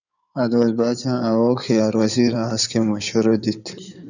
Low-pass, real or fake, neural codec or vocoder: 7.2 kHz; fake; codec, 16 kHz in and 24 kHz out, 2.2 kbps, FireRedTTS-2 codec